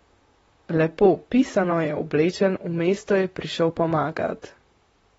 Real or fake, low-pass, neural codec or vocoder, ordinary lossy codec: fake; 19.8 kHz; vocoder, 44.1 kHz, 128 mel bands, Pupu-Vocoder; AAC, 24 kbps